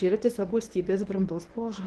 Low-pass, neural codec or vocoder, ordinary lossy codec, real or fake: 10.8 kHz; codec, 24 kHz, 0.9 kbps, WavTokenizer, medium speech release version 1; Opus, 16 kbps; fake